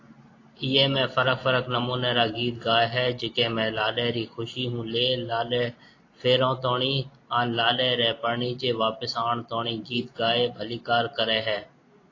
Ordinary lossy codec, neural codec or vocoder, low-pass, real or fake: AAC, 32 kbps; none; 7.2 kHz; real